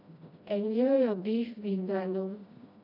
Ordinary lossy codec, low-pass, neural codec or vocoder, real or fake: MP3, 48 kbps; 5.4 kHz; codec, 16 kHz, 1 kbps, FreqCodec, smaller model; fake